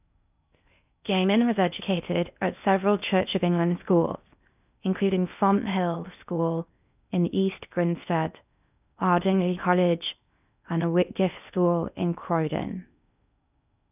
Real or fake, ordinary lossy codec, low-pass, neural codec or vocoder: fake; none; 3.6 kHz; codec, 16 kHz in and 24 kHz out, 0.6 kbps, FocalCodec, streaming, 4096 codes